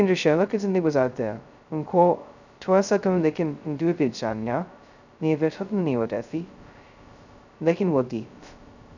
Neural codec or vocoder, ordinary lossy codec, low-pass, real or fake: codec, 16 kHz, 0.2 kbps, FocalCodec; none; 7.2 kHz; fake